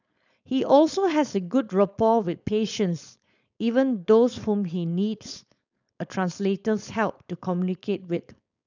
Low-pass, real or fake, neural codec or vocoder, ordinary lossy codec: 7.2 kHz; fake; codec, 16 kHz, 4.8 kbps, FACodec; none